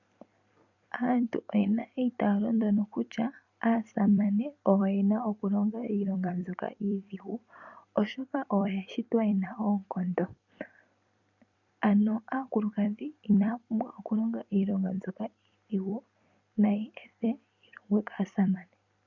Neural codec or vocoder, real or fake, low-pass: vocoder, 44.1 kHz, 128 mel bands every 256 samples, BigVGAN v2; fake; 7.2 kHz